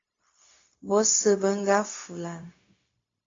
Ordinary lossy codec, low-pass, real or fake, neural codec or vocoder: AAC, 32 kbps; 7.2 kHz; fake; codec, 16 kHz, 0.4 kbps, LongCat-Audio-Codec